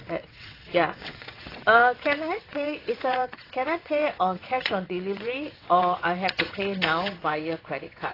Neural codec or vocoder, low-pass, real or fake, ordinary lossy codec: vocoder, 22.05 kHz, 80 mel bands, WaveNeXt; 5.4 kHz; fake; AAC, 24 kbps